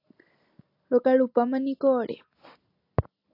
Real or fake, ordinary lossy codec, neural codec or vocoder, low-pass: real; AAC, 32 kbps; none; 5.4 kHz